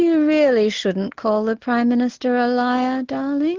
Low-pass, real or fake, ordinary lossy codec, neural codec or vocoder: 7.2 kHz; real; Opus, 16 kbps; none